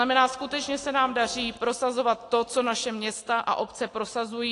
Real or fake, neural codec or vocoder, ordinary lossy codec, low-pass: real; none; AAC, 48 kbps; 10.8 kHz